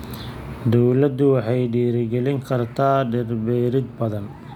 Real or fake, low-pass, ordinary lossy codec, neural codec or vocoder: real; 19.8 kHz; none; none